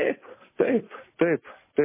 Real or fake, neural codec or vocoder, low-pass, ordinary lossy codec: real; none; 3.6 kHz; MP3, 16 kbps